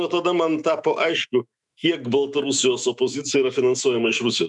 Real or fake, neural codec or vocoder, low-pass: fake; autoencoder, 48 kHz, 128 numbers a frame, DAC-VAE, trained on Japanese speech; 10.8 kHz